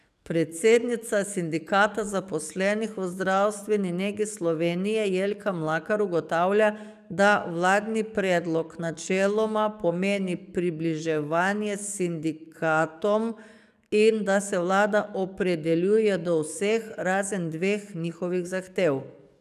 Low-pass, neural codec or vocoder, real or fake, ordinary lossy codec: 14.4 kHz; codec, 44.1 kHz, 7.8 kbps, DAC; fake; none